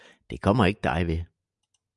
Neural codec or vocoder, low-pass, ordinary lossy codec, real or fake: none; 10.8 kHz; MP3, 96 kbps; real